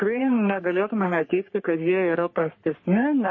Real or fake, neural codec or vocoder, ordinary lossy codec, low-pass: fake; codec, 44.1 kHz, 3.4 kbps, Pupu-Codec; MP3, 32 kbps; 7.2 kHz